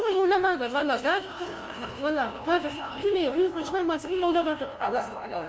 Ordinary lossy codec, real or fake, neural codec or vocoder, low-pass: none; fake; codec, 16 kHz, 0.5 kbps, FunCodec, trained on LibriTTS, 25 frames a second; none